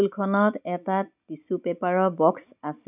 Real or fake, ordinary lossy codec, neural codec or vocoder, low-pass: real; none; none; 3.6 kHz